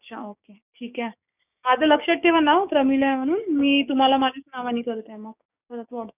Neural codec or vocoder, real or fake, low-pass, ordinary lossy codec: codec, 16 kHz, 6 kbps, DAC; fake; 3.6 kHz; none